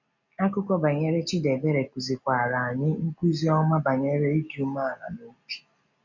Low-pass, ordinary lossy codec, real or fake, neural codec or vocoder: 7.2 kHz; none; real; none